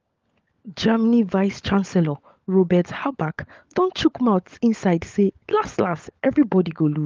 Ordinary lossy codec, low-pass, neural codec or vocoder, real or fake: Opus, 32 kbps; 7.2 kHz; codec, 16 kHz, 16 kbps, FunCodec, trained on LibriTTS, 50 frames a second; fake